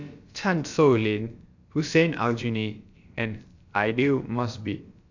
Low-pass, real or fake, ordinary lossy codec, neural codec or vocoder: 7.2 kHz; fake; AAC, 48 kbps; codec, 16 kHz, about 1 kbps, DyCAST, with the encoder's durations